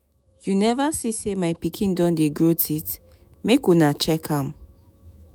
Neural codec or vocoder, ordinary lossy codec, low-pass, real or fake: autoencoder, 48 kHz, 128 numbers a frame, DAC-VAE, trained on Japanese speech; none; none; fake